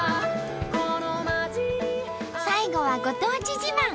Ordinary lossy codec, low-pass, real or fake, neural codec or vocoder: none; none; real; none